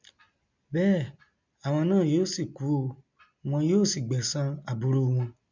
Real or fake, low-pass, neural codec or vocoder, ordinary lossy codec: real; 7.2 kHz; none; MP3, 64 kbps